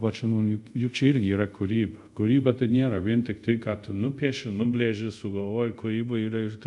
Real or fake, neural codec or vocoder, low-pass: fake; codec, 24 kHz, 0.5 kbps, DualCodec; 10.8 kHz